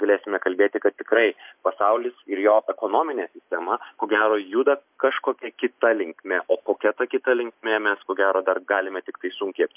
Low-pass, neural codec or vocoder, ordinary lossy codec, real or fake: 3.6 kHz; none; MP3, 32 kbps; real